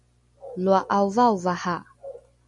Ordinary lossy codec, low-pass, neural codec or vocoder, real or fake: MP3, 48 kbps; 10.8 kHz; none; real